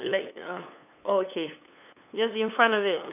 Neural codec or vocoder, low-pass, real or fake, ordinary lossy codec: codec, 16 kHz, 8 kbps, FunCodec, trained on LibriTTS, 25 frames a second; 3.6 kHz; fake; none